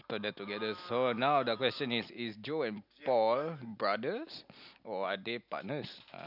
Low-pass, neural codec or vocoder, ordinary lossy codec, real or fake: 5.4 kHz; none; none; real